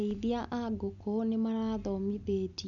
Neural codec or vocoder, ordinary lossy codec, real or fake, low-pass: none; none; real; 7.2 kHz